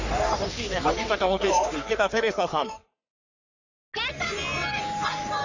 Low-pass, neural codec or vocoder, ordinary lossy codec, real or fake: 7.2 kHz; codec, 44.1 kHz, 3.4 kbps, Pupu-Codec; none; fake